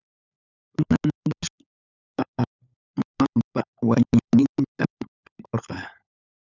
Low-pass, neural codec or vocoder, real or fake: 7.2 kHz; codec, 16 kHz, 16 kbps, FunCodec, trained on LibriTTS, 50 frames a second; fake